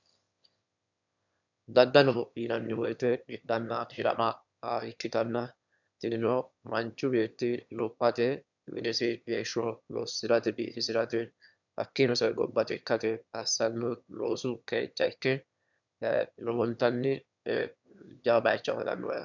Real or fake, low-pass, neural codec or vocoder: fake; 7.2 kHz; autoencoder, 22.05 kHz, a latent of 192 numbers a frame, VITS, trained on one speaker